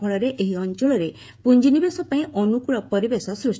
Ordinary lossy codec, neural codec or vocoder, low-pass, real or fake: none; codec, 16 kHz, 16 kbps, FreqCodec, smaller model; none; fake